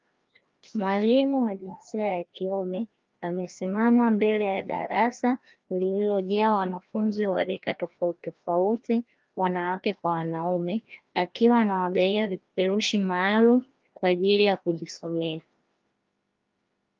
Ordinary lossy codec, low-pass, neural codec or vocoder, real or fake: Opus, 32 kbps; 7.2 kHz; codec, 16 kHz, 1 kbps, FreqCodec, larger model; fake